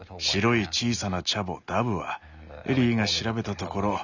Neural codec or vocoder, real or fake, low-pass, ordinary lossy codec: none; real; 7.2 kHz; none